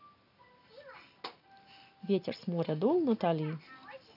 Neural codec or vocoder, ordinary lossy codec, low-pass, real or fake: none; none; 5.4 kHz; real